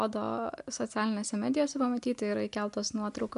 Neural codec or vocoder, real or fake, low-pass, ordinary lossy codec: none; real; 10.8 kHz; MP3, 64 kbps